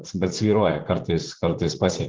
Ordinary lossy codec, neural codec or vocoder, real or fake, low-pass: Opus, 16 kbps; vocoder, 44.1 kHz, 80 mel bands, Vocos; fake; 7.2 kHz